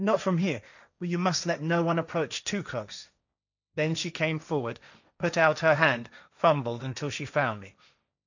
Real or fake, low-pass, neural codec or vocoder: fake; 7.2 kHz; codec, 16 kHz, 1.1 kbps, Voila-Tokenizer